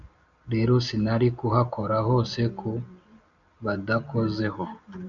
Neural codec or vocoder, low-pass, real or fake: none; 7.2 kHz; real